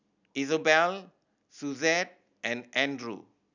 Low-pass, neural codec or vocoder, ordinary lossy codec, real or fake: 7.2 kHz; none; none; real